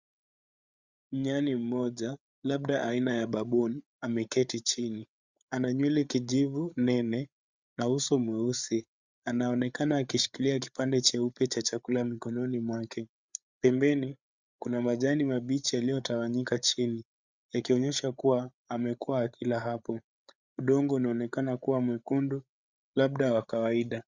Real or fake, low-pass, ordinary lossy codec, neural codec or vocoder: fake; 7.2 kHz; Opus, 64 kbps; codec, 16 kHz, 8 kbps, FreqCodec, larger model